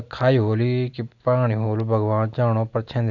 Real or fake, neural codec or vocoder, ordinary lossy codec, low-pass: real; none; none; 7.2 kHz